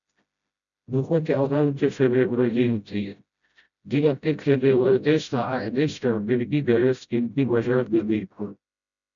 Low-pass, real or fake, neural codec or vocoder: 7.2 kHz; fake; codec, 16 kHz, 0.5 kbps, FreqCodec, smaller model